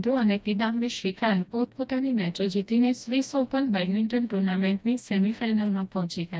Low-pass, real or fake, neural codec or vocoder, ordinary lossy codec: none; fake; codec, 16 kHz, 1 kbps, FreqCodec, smaller model; none